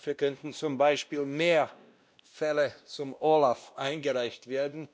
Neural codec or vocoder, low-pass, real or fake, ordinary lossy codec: codec, 16 kHz, 1 kbps, X-Codec, WavLM features, trained on Multilingual LibriSpeech; none; fake; none